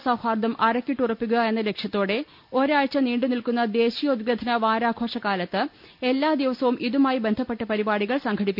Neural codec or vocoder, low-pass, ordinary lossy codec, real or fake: none; 5.4 kHz; none; real